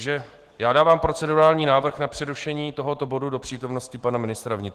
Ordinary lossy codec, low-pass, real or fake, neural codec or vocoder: Opus, 16 kbps; 14.4 kHz; fake; autoencoder, 48 kHz, 128 numbers a frame, DAC-VAE, trained on Japanese speech